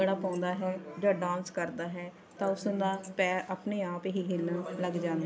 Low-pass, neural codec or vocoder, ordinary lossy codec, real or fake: none; none; none; real